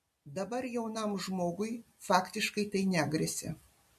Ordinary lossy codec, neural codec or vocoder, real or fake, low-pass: MP3, 64 kbps; none; real; 14.4 kHz